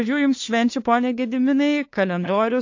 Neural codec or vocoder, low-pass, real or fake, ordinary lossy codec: codec, 16 kHz, 2 kbps, FunCodec, trained on LibriTTS, 25 frames a second; 7.2 kHz; fake; AAC, 48 kbps